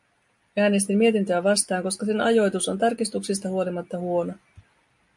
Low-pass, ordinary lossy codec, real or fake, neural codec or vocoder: 10.8 kHz; MP3, 64 kbps; real; none